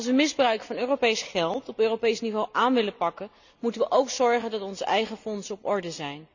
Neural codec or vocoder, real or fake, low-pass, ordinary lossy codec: none; real; 7.2 kHz; none